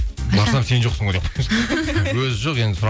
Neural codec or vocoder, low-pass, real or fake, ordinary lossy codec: none; none; real; none